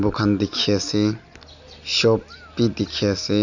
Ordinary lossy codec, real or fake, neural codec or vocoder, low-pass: none; real; none; 7.2 kHz